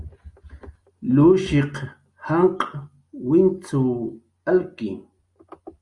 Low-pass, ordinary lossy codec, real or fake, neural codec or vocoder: 10.8 kHz; Opus, 64 kbps; real; none